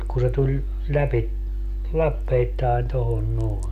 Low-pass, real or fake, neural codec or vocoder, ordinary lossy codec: 14.4 kHz; real; none; none